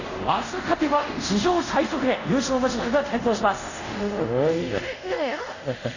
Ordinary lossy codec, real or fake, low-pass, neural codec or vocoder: none; fake; 7.2 kHz; codec, 24 kHz, 0.5 kbps, DualCodec